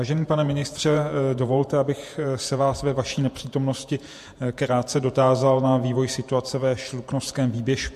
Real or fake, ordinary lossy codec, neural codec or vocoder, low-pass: fake; MP3, 64 kbps; vocoder, 48 kHz, 128 mel bands, Vocos; 14.4 kHz